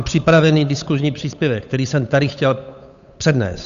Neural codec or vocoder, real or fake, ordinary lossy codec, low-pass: codec, 16 kHz, 8 kbps, FunCodec, trained on Chinese and English, 25 frames a second; fake; AAC, 96 kbps; 7.2 kHz